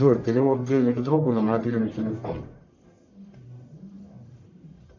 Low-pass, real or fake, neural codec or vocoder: 7.2 kHz; fake; codec, 44.1 kHz, 1.7 kbps, Pupu-Codec